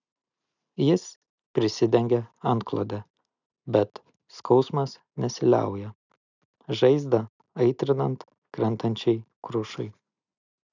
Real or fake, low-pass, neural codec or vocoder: real; 7.2 kHz; none